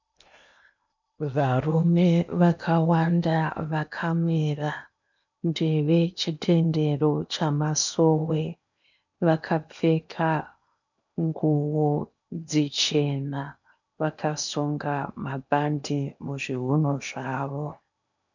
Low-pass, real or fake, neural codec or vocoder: 7.2 kHz; fake; codec, 16 kHz in and 24 kHz out, 0.8 kbps, FocalCodec, streaming, 65536 codes